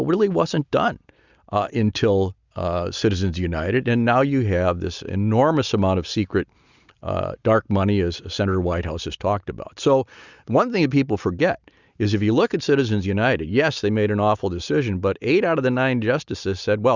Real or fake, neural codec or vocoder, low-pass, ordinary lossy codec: real; none; 7.2 kHz; Opus, 64 kbps